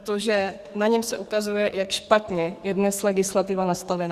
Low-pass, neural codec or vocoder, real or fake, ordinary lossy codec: 14.4 kHz; codec, 44.1 kHz, 2.6 kbps, SNAC; fake; Opus, 64 kbps